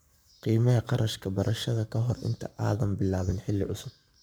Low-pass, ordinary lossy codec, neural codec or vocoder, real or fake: none; none; codec, 44.1 kHz, 7.8 kbps, Pupu-Codec; fake